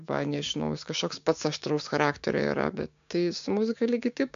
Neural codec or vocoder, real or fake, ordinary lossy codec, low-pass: codec, 16 kHz, 6 kbps, DAC; fake; MP3, 64 kbps; 7.2 kHz